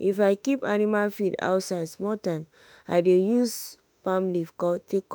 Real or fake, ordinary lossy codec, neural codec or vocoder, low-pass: fake; none; autoencoder, 48 kHz, 32 numbers a frame, DAC-VAE, trained on Japanese speech; none